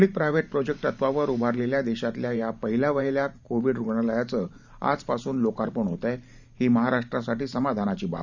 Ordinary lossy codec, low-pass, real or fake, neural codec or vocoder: none; 7.2 kHz; fake; vocoder, 44.1 kHz, 128 mel bands every 512 samples, BigVGAN v2